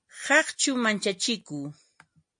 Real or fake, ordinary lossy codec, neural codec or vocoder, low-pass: real; MP3, 48 kbps; none; 9.9 kHz